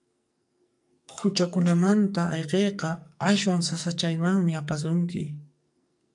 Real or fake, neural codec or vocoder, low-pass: fake; codec, 44.1 kHz, 2.6 kbps, SNAC; 10.8 kHz